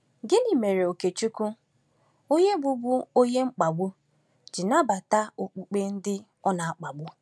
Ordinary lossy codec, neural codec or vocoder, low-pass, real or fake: none; none; none; real